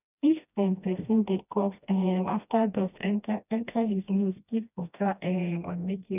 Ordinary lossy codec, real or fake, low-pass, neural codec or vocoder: none; fake; 3.6 kHz; codec, 16 kHz, 1 kbps, FreqCodec, smaller model